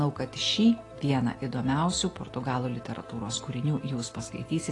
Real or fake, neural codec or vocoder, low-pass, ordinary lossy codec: real; none; 10.8 kHz; AAC, 32 kbps